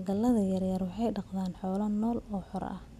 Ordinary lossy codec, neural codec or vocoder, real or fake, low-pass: none; none; real; 14.4 kHz